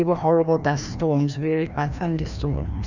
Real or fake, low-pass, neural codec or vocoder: fake; 7.2 kHz; codec, 16 kHz, 1 kbps, FreqCodec, larger model